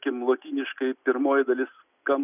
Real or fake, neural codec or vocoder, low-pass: real; none; 3.6 kHz